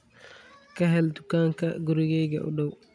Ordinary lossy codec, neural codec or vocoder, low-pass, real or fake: none; none; 9.9 kHz; real